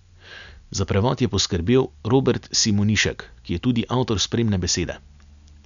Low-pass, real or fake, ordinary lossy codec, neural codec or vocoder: 7.2 kHz; real; none; none